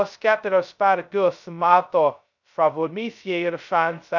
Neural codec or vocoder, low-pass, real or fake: codec, 16 kHz, 0.2 kbps, FocalCodec; 7.2 kHz; fake